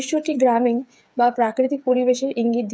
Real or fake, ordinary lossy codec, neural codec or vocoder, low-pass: fake; none; codec, 16 kHz, 8 kbps, FreqCodec, smaller model; none